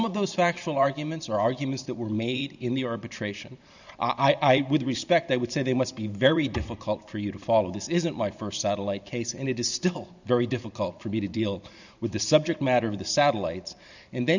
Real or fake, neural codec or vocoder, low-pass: fake; vocoder, 44.1 kHz, 128 mel bands every 512 samples, BigVGAN v2; 7.2 kHz